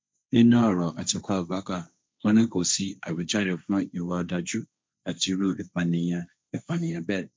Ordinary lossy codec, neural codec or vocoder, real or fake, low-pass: none; codec, 16 kHz, 1.1 kbps, Voila-Tokenizer; fake; none